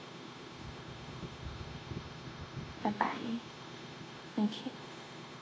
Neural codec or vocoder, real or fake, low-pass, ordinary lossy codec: codec, 16 kHz, 0.9 kbps, LongCat-Audio-Codec; fake; none; none